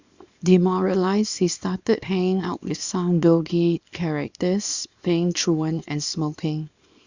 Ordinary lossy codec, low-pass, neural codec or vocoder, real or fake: Opus, 64 kbps; 7.2 kHz; codec, 24 kHz, 0.9 kbps, WavTokenizer, small release; fake